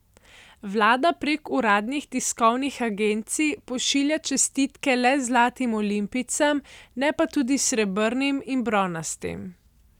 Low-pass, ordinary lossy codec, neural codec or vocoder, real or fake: 19.8 kHz; none; none; real